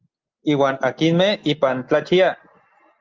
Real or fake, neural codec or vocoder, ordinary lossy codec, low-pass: real; none; Opus, 24 kbps; 7.2 kHz